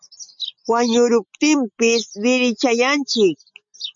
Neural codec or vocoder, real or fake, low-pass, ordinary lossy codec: none; real; 7.2 kHz; MP3, 48 kbps